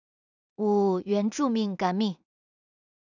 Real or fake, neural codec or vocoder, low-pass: fake; codec, 16 kHz in and 24 kHz out, 0.4 kbps, LongCat-Audio-Codec, two codebook decoder; 7.2 kHz